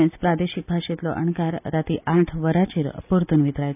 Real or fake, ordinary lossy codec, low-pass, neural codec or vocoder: real; none; 3.6 kHz; none